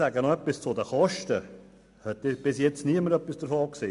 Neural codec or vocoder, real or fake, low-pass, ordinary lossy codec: none; real; 10.8 kHz; MP3, 64 kbps